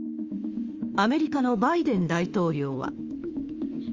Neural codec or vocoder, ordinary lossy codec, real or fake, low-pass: autoencoder, 48 kHz, 32 numbers a frame, DAC-VAE, trained on Japanese speech; Opus, 32 kbps; fake; 7.2 kHz